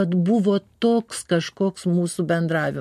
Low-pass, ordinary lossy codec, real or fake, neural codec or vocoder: 14.4 kHz; MP3, 64 kbps; real; none